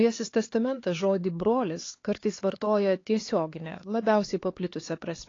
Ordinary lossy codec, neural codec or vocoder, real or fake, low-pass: AAC, 32 kbps; codec, 16 kHz, 4 kbps, X-Codec, HuBERT features, trained on LibriSpeech; fake; 7.2 kHz